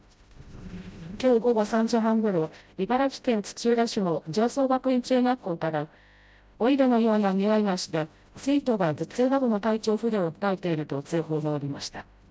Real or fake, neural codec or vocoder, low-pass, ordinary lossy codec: fake; codec, 16 kHz, 0.5 kbps, FreqCodec, smaller model; none; none